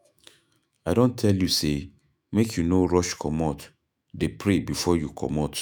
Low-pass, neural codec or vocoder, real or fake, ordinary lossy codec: none; autoencoder, 48 kHz, 128 numbers a frame, DAC-VAE, trained on Japanese speech; fake; none